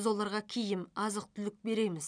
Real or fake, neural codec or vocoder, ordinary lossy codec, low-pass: real; none; none; 9.9 kHz